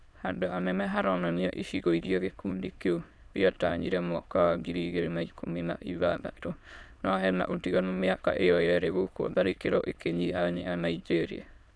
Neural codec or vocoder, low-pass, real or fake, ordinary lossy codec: autoencoder, 22.05 kHz, a latent of 192 numbers a frame, VITS, trained on many speakers; none; fake; none